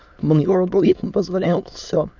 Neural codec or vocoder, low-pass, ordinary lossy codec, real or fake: autoencoder, 22.05 kHz, a latent of 192 numbers a frame, VITS, trained on many speakers; 7.2 kHz; none; fake